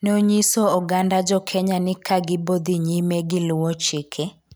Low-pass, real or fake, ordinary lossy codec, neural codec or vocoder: none; real; none; none